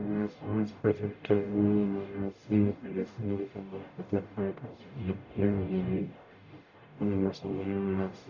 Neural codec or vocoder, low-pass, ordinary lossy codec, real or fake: codec, 44.1 kHz, 0.9 kbps, DAC; 7.2 kHz; none; fake